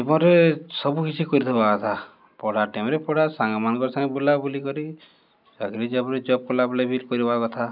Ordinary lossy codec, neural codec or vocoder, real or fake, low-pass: none; none; real; 5.4 kHz